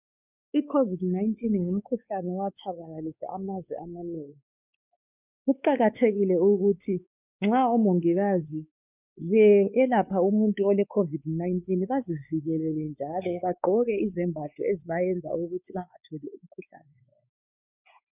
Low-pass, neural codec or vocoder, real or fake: 3.6 kHz; codec, 16 kHz, 4 kbps, X-Codec, WavLM features, trained on Multilingual LibriSpeech; fake